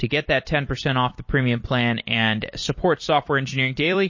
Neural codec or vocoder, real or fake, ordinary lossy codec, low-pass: vocoder, 44.1 kHz, 128 mel bands every 256 samples, BigVGAN v2; fake; MP3, 32 kbps; 7.2 kHz